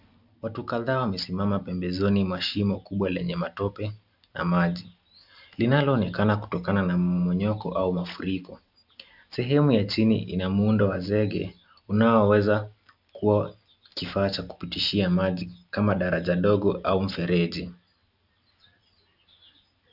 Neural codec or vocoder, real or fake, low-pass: none; real; 5.4 kHz